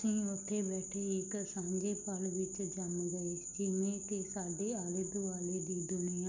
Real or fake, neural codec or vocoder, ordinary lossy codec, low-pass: real; none; none; 7.2 kHz